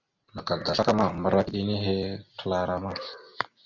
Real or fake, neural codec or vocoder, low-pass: real; none; 7.2 kHz